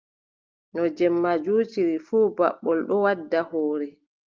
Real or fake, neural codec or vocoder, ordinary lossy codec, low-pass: real; none; Opus, 24 kbps; 7.2 kHz